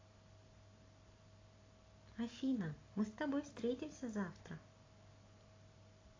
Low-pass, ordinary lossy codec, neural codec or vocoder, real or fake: 7.2 kHz; AAC, 32 kbps; none; real